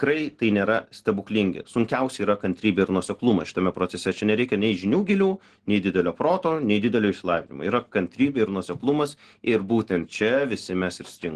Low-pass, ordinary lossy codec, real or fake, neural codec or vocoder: 10.8 kHz; Opus, 16 kbps; real; none